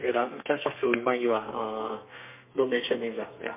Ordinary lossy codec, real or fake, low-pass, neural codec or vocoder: MP3, 32 kbps; fake; 3.6 kHz; codec, 32 kHz, 1.9 kbps, SNAC